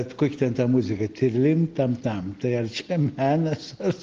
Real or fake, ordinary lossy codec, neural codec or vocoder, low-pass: real; Opus, 16 kbps; none; 7.2 kHz